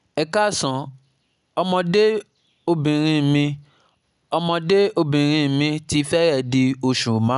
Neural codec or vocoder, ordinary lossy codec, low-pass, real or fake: none; none; 10.8 kHz; real